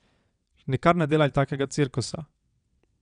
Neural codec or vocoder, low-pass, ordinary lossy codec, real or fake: vocoder, 22.05 kHz, 80 mel bands, WaveNeXt; 9.9 kHz; none; fake